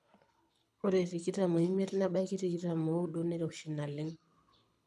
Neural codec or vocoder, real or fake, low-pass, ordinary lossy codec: codec, 24 kHz, 6 kbps, HILCodec; fake; none; none